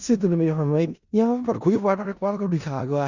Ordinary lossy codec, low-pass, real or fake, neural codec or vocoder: Opus, 64 kbps; 7.2 kHz; fake; codec, 16 kHz in and 24 kHz out, 0.4 kbps, LongCat-Audio-Codec, four codebook decoder